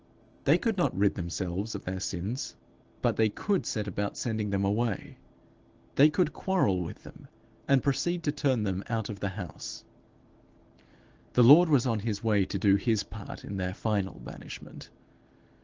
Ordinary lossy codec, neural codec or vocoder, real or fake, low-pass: Opus, 16 kbps; none; real; 7.2 kHz